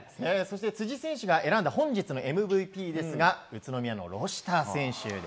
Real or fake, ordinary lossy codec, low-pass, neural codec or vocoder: real; none; none; none